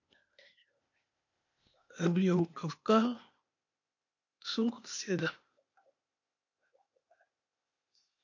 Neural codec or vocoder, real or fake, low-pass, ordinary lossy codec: codec, 16 kHz, 0.8 kbps, ZipCodec; fake; 7.2 kHz; MP3, 48 kbps